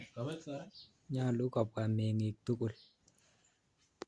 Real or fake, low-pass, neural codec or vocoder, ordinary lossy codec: real; 9.9 kHz; none; Opus, 64 kbps